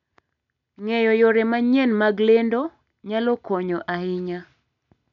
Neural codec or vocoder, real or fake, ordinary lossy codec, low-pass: none; real; none; 7.2 kHz